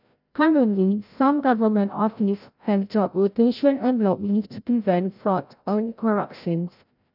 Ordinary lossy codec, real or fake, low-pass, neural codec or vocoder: none; fake; 5.4 kHz; codec, 16 kHz, 0.5 kbps, FreqCodec, larger model